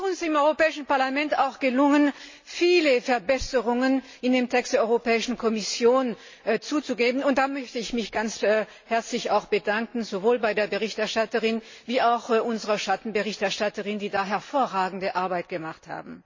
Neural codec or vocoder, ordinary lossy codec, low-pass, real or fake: none; MP3, 32 kbps; 7.2 kHz; real